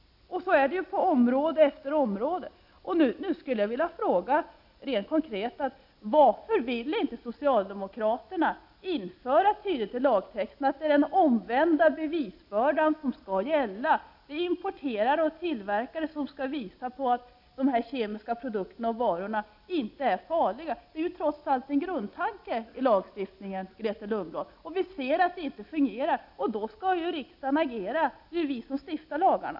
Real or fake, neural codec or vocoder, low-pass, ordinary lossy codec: real; none; 5.4 kHz; AAC, 48 kbps